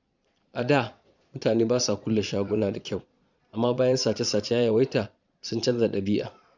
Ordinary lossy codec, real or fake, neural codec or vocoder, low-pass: none; fake; vocoder, 22.05 kHz, 80 mel bands, Vocos; 7.2 kHz